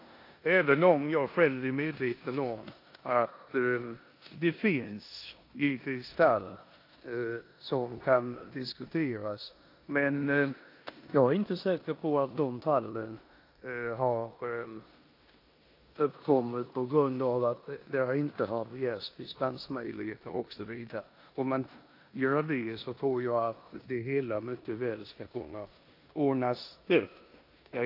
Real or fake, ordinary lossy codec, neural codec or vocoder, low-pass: fake; AAC, 32 kbps; codec, 16 kHz in and 24 kHz out, 0.9 kbps, LongCat-Audio-Codec, four codebook decoder; 5.4 kHz